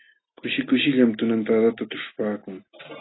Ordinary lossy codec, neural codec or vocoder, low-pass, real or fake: AAC, 16 kbps; none; 7.2 kHz; real